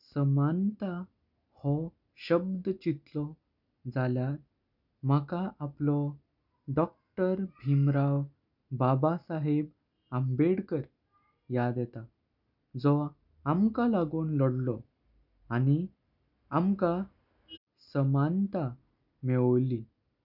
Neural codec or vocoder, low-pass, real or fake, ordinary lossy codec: none; 5.4 kHz; real; none